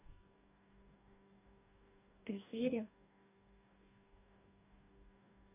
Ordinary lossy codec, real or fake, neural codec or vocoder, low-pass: none; fake; codec, 44.1 kHz, 2.6 kbps, DAC; 3.6 kHz